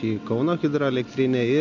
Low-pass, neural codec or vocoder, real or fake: 7.2 kHz; none; real